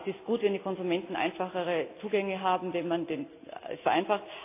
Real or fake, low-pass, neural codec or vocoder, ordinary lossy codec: real; 3.6 kHz; none; AAC, 24 kbps